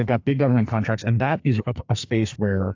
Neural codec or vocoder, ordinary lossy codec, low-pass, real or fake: codec, 44.1 kHz, 2.6 kbps, SNAC; AAC, 48 kbps; 7.2 kHz; fake